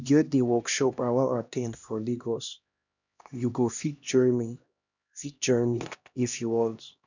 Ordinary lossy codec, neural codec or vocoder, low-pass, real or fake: none; codec, 16 kHz, 1 kbps, X-Codec, HuBERT features, trained on LibriSpeech; 7.2 kHz; fake